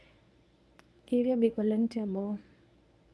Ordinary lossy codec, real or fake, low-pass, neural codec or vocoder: none; fake; none; codec, 24 kHz, 0.9 kbps, WavTokenizer, medium speech release version 1